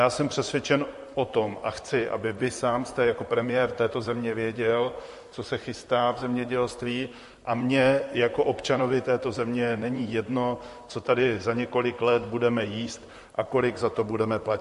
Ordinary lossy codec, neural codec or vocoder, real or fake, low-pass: MP3, 48 kbps; vocoder, 44.1 kHz, 128 mel bands, Pupu-Vocoder; fake; 14.4 kHz